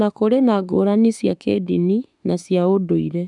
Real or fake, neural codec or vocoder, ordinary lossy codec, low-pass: fake; autoencoder, 48 kHz, 32 numbers a frame, DAC-VAE, trained on Japanese speech; none; 10.8 kHz